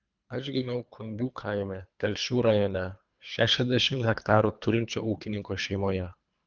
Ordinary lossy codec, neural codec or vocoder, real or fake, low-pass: Opus, 24 kbps; codec, 24 kHz, 3 kbps, HILCodec; fake; 7.2 kHz